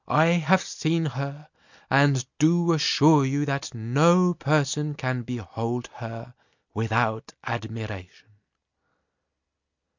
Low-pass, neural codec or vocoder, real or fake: 7.2 kHz; none; real